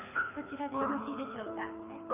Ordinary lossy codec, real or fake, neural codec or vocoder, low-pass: none; fake; codec, 24 kHz, 6 kbps, HILCodec; 3.6 kHz